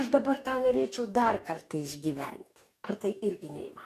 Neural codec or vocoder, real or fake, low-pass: codec, 44.1 kHz, 2.6 kbps, DAC; fake; 14.4 kHz